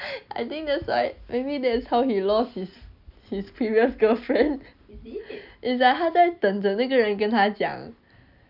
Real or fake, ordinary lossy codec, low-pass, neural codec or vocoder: real; none; 5.4 kHz; none